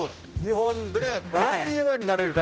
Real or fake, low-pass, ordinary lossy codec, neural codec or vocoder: fake; none; none; codec, 16 kHz, 0.5 kbps, X-Codec, HuBERT features, trained on general audio